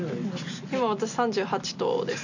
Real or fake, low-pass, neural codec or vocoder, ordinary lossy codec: real; 7.2 kHz; none; none